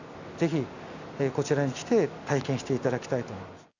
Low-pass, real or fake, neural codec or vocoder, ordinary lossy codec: 7.2 kHz; real; none; none